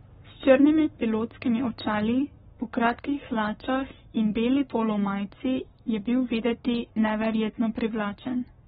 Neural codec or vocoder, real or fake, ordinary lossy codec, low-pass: codec, 44.1 kHz, 7.8 kbps, Pupu-Codec; fake; AAC, 16 kbps; 19.8 kHz